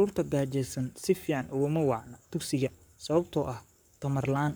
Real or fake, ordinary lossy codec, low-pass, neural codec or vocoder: fake; none; none; codec, 44.1 kHz, 7.8 kbps, Pupu-Codec